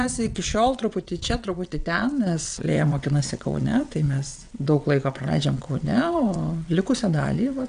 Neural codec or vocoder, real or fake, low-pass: vocoder, 22.05 kHz, 80 mel bands, WaveNeXt; fake; 9.9 kHz